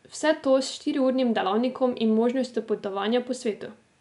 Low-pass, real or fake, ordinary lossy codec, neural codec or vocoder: 10.8 kHz; real; none; none